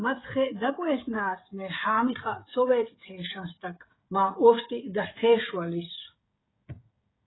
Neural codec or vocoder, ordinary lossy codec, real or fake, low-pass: codec, 16 kHz, 16 kbps, FreqCodec, smaller model; AAC, 16 kbps; fake; 7.2 kHz